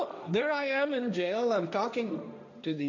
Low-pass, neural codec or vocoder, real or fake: 7.2 kHz; codec, 16 kHz, 1.1 kbps, Voila-Tokenizer; fake